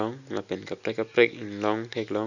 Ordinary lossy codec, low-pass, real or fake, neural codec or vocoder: none; 7.2 kHz; real; none